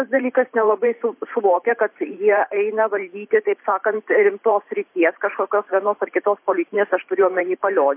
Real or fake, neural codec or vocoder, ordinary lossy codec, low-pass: fake; vocoder, 44.1 kHz, 128 mel bands every 512 samples, BigVGAN v2; MP3, 24 kbps; 3.6 kHz